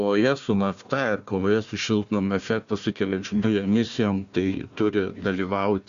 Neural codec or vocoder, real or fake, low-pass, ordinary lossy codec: codec, 16 kHz, 1 kbps, FunCodec, trained on Chinese and English, 50 frames a second; fake; 7.2 kHz; Opus, 64 kbps